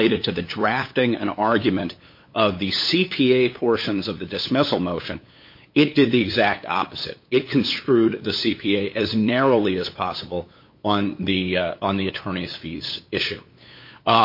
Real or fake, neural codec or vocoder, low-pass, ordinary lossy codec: fake; codec, 16 kHz, 8 kbps, FunCodec, trained on LibriTTS, 25 frames a second; 5.4 kHz; MP3, 32 kbps